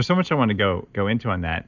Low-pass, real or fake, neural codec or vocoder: 7.2 kHz; real; none